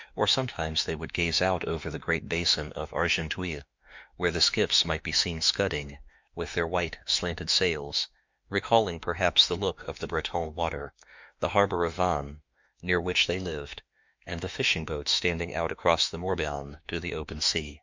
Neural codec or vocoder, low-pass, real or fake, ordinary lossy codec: autoencoder, 48 kHz, 32 numbers a frame, DAC-VAE, trained on Japanese speech; 7.2 kHz; fake; MP3, 64 kbps